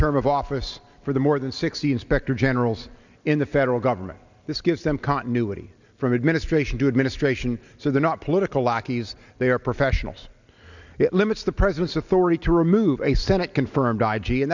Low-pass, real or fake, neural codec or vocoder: 7.2 kHz; real; none